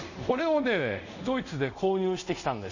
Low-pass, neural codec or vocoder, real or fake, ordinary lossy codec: 7.2 kHz; codec, 24 kHz, 0.5 kbps, DualCodec; fake; none